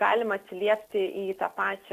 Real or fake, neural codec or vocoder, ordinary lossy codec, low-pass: real; none; AAC, 64 kbps; 14.4 kHz